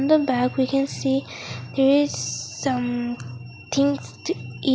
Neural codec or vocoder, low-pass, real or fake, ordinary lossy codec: none; none; real; none